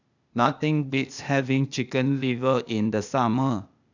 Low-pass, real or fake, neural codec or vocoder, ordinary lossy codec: 7.2 kHz; fake; codec, 16 kHz, 0.8 kbps, ZipCodec; none